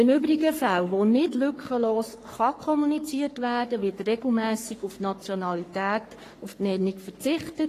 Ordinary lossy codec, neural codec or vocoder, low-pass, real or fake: AAC, 48 kbps; codec, 44.1 kHz, 3.4 kbps, Pupu-Codec; 14.4 kHz; fake